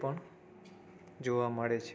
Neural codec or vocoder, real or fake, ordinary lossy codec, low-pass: none; real; none; none